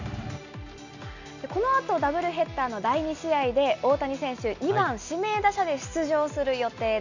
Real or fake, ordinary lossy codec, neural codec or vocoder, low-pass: real; none; none; 7.2 kHz